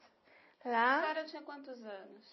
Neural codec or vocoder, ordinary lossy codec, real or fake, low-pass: none; MP3, 24 kbps; real; 7.2 kHz